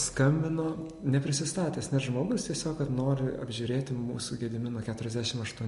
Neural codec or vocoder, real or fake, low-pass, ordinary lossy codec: none; real; 14.4 kHz; MP3, 48 kbps